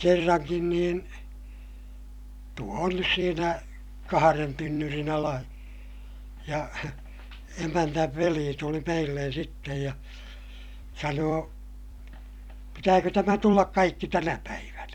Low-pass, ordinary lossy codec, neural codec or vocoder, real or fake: 19.8 kHz; none; vocoder, 44.1 kHz, 128 mel bands every 256 samples, BigVGAN v2; fake